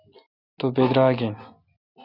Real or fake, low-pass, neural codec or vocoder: real; 5.4 kHz; none